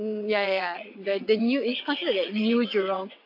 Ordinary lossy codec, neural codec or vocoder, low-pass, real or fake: AAC, 32 kbps; vocoder, 22.05 kHz, 80 mel bands, WaveNeXt; 5.4 kHz; fake